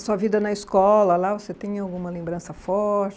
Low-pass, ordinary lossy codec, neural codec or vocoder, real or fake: none; none; none; real